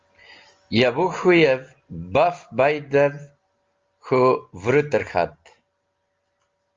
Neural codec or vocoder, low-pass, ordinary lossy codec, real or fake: none; 7.2 kHz; Opus, 32 kbps; real